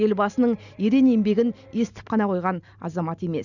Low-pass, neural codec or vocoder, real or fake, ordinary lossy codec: 7.2 kHz; none; real; none